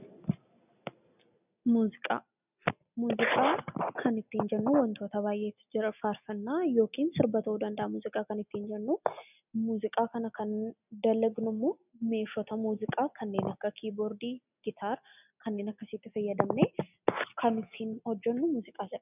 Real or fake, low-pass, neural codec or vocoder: real; 3.6 kHz; none